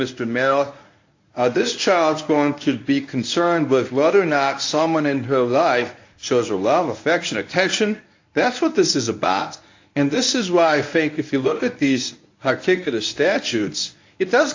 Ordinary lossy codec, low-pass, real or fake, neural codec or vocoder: AAC, 48 kbps; 7.2 kHz; fake; codec, 24 kHz, 0.9 kbps, WavTokenizer, medium speech release version 1